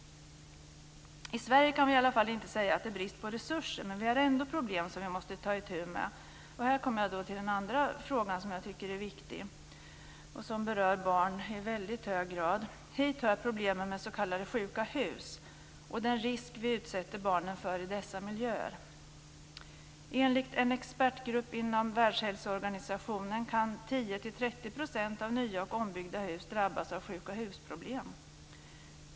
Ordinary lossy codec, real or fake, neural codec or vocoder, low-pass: none; real; none; none